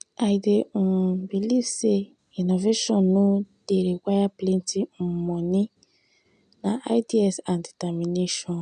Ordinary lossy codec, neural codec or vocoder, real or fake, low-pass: none; none; real; 9.9 kHz